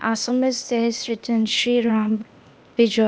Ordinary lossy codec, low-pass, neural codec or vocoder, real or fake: none; none; codec, 16 kHz, 0.8 kbps, ZipCodec; fake